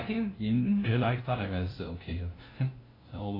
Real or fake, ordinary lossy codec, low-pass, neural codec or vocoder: fake; AAC, 24 kbps; 5.4 kHz; codec, 16 kHz, 0.5 kbps, FunCodec, trained on LibriTTS, 25 frames a second